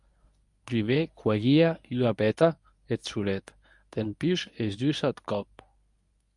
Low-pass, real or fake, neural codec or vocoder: 10.8 kHz; fake; codec, 24 kHz, 0.9 kbps, WavTokenizer, medium speech release version 1